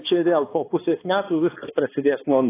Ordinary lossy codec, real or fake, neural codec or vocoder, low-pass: AAC, 16 kbps; fake; codec, 16 kHz, 2 kbps, X-Codec, HuBERT features, trained on balanced general audio; 3.6 kHz